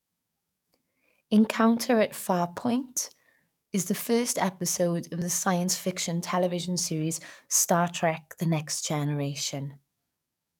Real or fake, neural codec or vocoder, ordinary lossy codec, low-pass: fake; codec, 44.1 kHz, 7.8 kbps, DAC; none; 19.8 kHz